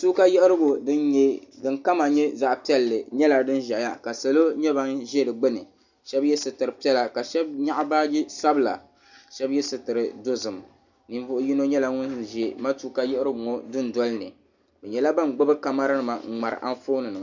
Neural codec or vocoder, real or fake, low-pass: none; real; 7.2 kHz